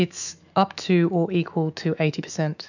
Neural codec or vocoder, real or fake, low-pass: autoencoder, 48 kHz, 128 numbers a frame, DAC-VAE, trained on Japanese speech; fake; 7.2 kHz